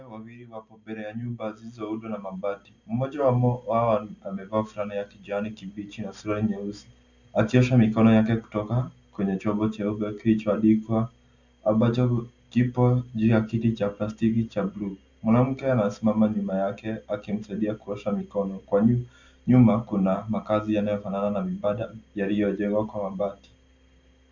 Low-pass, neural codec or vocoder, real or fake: 7.2 kHz; none; real